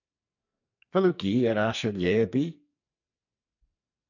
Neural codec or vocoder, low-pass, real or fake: codec, 44.1 kHz, 2.6 kbps, SNAC; 7.2 kHz; fake